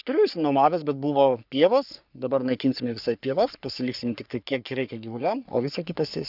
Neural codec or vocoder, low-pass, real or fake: codec, 44.1 kHz, 3.4 kbps, Pupu-Codec; 5.4 kHz; fake